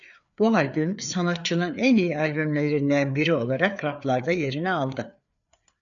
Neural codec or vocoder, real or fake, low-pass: codec, 16 kHz, 4 kbps, FreqCodec, larger model; fake; 7.2 kHz